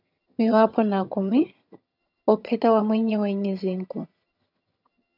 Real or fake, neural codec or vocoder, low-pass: fake; vocoder, 22.05 kHz, 80 mel bands, HiFi-GAN; 5.4 kHz